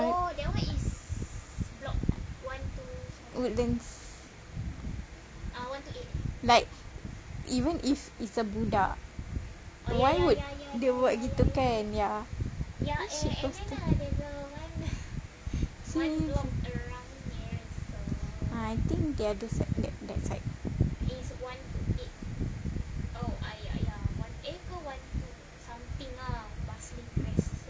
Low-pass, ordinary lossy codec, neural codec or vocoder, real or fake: none; none; none; real